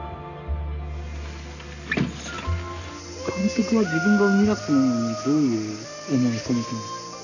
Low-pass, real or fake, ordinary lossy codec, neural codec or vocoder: 7.2 kHz; real; none; none